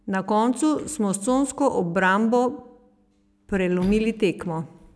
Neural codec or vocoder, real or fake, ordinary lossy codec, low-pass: none; real; none; none